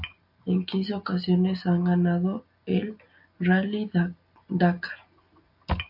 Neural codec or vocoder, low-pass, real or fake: none; 5.4 kHz; real